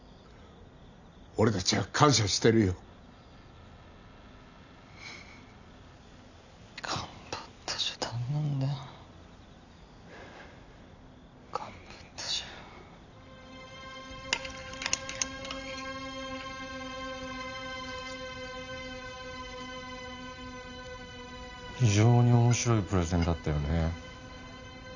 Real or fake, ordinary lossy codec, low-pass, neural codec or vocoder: real; none; 7.2 kHz; none